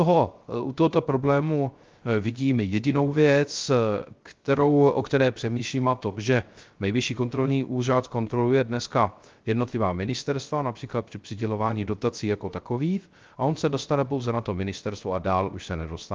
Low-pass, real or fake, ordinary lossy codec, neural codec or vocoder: 7.2 kHz; fake; Opus, 32 kbps; codec, 16 kHz, 0.3 kbps, FocalCodec